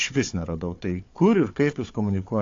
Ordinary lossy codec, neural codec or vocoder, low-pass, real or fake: AAC, 48 kbps; codec, 16 kHz, 4 kbps, FunCodec, trained on Chinese and English, 50 frames a second; 7.2 kHz; fake